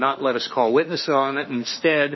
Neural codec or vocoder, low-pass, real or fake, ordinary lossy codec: autoencoder, 48 kHz, 32 numbers a frame, DAC-VAE, trained on Japanese speech; 7.2 kHz; fake; MP3, 24 kbps